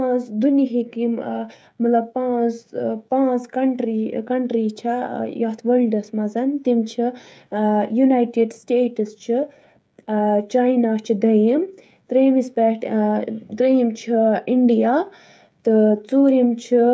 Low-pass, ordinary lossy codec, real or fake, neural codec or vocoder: none; none; fake; codec, 16 kHz, 16 kbps, FreqCodec, smaller model